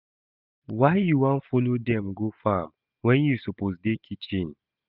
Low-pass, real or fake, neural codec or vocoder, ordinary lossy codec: 5.4 kHz; real; none; none